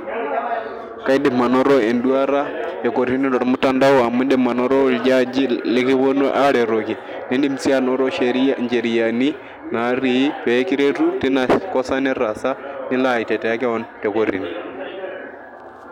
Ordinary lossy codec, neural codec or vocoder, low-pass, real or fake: none; none; 19.8 kHz; real